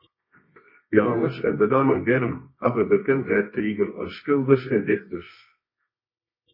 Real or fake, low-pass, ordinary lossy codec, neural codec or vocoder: fake; 5.4 kHz; MP3, 24 kbps; codec, 24 kHz, 0.9 kbps, WavTokenizer, medium music audio release